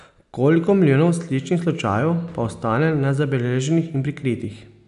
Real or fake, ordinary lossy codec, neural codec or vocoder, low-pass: real; none; none; 10.8 kHz